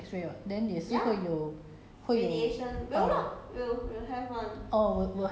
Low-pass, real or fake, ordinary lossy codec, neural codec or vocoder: none; real; none; none